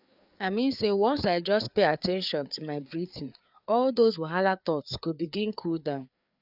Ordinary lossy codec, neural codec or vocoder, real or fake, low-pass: none; codec, 16 kHz, 4 kbps, FreqCodec, larger model; fake; 5.4 kHz